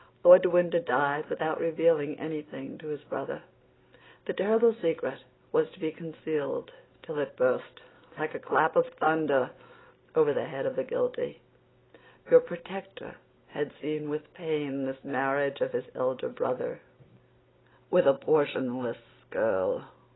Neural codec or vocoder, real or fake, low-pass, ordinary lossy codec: none; real; 7.2 kHz; AAC, 16 kbps